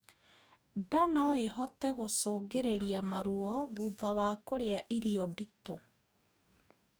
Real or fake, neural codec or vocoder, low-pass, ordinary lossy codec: fake; codec, 44.1 kHz, 2.6 kbps, DAC; none; none